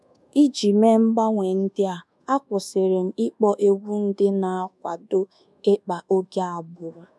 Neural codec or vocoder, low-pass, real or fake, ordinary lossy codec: codec, 24 kHz, 1.2 kbps, DualCodec; none; fake; none